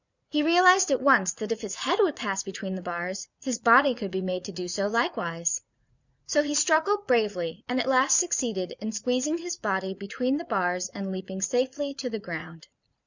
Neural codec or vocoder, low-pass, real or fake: vocoder, 44.1 kHz, 80 mel bands, Vocos; 7.2 kHz; fake